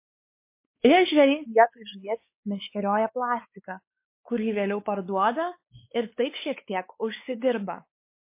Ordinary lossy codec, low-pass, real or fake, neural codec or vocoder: MP3, 24 kbps; 3.6 kHz; fake; codec, 16 kHz, 4 kbps, X-Codec, WavLM features, trained on Multilingual LibriSpeech